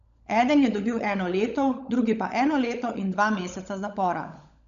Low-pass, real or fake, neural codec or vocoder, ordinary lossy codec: 7.2 kHz; fake; codec, 16 kHz, 16 kbps, FunCodec, trained on LibriTTS, 50 frames a second; none